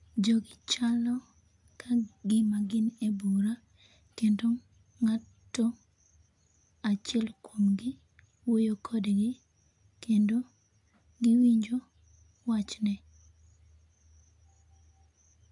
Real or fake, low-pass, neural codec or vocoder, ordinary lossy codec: real; 10.8 kHz; none; AAC, 64 kbps